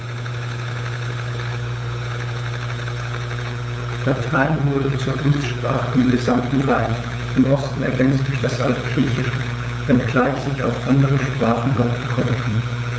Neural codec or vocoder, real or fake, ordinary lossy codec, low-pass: codec, 16 kHz, 8 kbps, FunCodec, trained on LibriTTS, 25 frames a second; fake; none; none